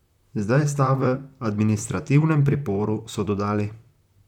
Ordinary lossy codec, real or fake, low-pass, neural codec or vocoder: none; fake; 19.8 kHz; vocoder, 44.1 kHz, 128 mel bands, Pupu-Vocoder